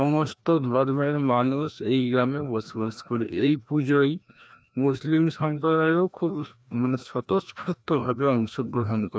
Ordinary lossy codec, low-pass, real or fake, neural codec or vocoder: none; none; fake; codec, 16 kHz, 1 kbps, FreqCodec, larger model